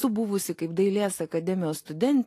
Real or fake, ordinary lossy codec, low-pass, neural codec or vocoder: real; AAC, 48 kbps; 14.4 kHz; none